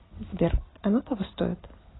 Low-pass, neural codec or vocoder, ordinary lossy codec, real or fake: 7.2 kHz; none; AAC, 16 kbps; real